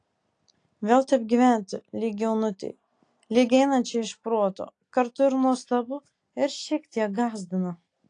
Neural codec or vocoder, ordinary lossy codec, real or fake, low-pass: none; AAC, 48 kbps; real; 9.9 kHz